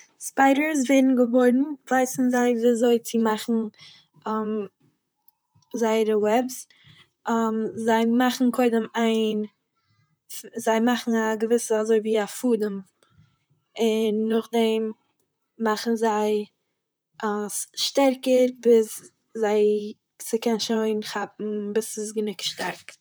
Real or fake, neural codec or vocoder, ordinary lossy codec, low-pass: fake; vocoder, 44.1 kHz, 128 mel bands, Pupu-Vocoder; none; none